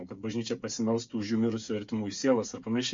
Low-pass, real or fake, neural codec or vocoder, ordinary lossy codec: 7.2 kHz; fake; codec, 16 kHz, 8 kbps, FreqCodec, smaller model; MP3, 48 kbps